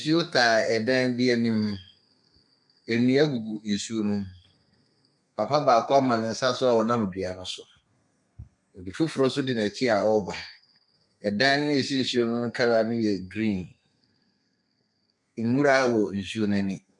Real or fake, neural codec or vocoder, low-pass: fake; codec, 32 kHz, 1.9 kbps, SNAC; 10.8 kHz